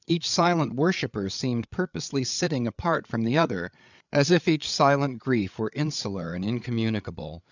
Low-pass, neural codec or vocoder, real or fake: 7.2 kHz; codec, 16 kHz, 8 kbps, FreqCodec, larger model; fake